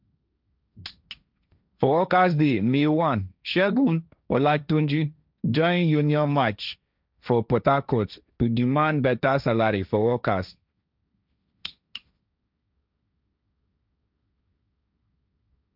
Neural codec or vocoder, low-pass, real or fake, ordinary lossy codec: codec, 16 kHz, 1.1 kbps, Voila-Tokenizer; 5.4 kHz; fake; none